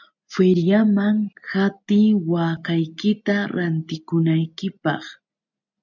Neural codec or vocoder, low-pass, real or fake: none; 7.2 kHz; real